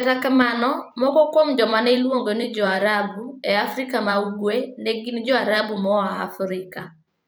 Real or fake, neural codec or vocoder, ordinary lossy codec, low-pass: fake; vocoder, 44.1 kHz, 128 mel bands every 512 samples, BigVGAN v2; none; none